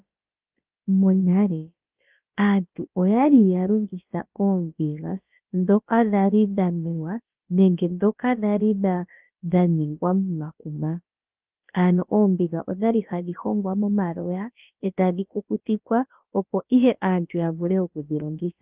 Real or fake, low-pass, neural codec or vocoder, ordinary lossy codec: fake; 3.6 kHz; codec, 16 kHz, about 1 kbps, DyCAST, with the encoder's durations; Opus, 32 kbps